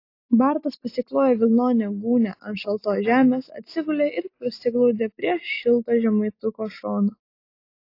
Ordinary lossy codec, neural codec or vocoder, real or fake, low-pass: AAC, 32 kbps; none; real; 5.4 kHz